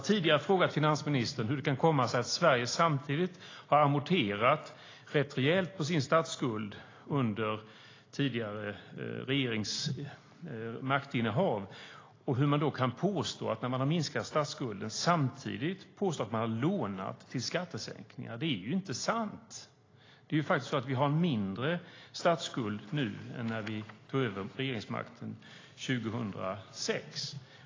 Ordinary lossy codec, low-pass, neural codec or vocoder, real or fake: AAC, 32 kbps; 7.2 kHz; none; real